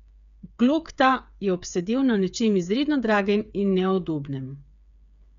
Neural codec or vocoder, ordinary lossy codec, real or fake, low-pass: codec, 16 kHz, 8 kbps, FreqCodec, smaller model; none; fake; 7.2 kHz